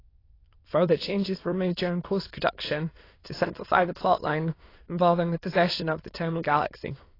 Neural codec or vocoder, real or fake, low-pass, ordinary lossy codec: autoencoder, 22.05 kHz, a latent of 192 numbers a frame, VITS, trained on many speakers; fake; 5.4 kHz; AAC, 24 kbps